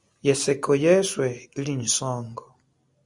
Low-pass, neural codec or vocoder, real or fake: 10.8 kHz; none; real